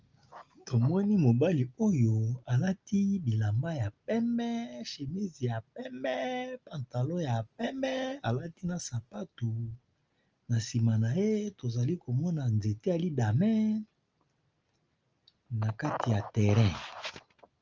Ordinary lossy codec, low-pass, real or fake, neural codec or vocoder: Opus, 24 kbps; 7.2 kHz; real; none